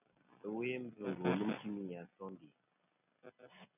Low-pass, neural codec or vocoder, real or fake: 3.6 kHz; none; real